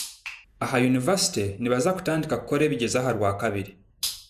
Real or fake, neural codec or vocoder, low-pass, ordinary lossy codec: fake; vocoder, 48 kHz, 128 mel bands, Vocos; 14.4 kHz; none